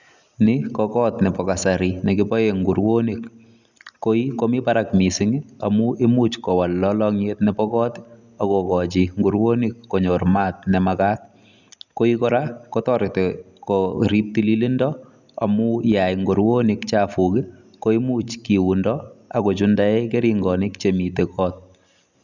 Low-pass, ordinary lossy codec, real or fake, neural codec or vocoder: 7.2 kHz; none; real; none